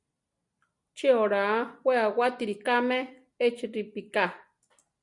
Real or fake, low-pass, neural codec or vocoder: real; 10.8 kHz; none